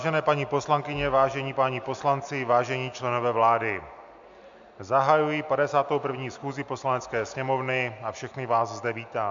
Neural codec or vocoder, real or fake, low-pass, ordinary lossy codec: none; real; 7.2 kHz; MP3, 64 kbps